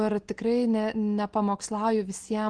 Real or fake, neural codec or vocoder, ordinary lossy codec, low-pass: real; none; Opus, 16 kbps; 9.9 kHz